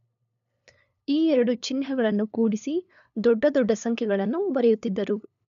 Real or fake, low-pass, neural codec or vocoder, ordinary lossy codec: fake; 7.2 kHz; codec, 16 kHz, 2 kbps, FunCodec, trained on LibriTTS, 25 frames a second; none